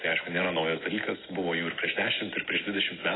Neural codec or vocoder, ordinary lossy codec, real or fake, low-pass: none; AAC, 16 kbps; real; 7.2 kHz